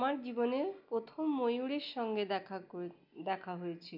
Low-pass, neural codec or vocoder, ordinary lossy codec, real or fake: 5.4 kHz; none; MP3, 48 kbps; real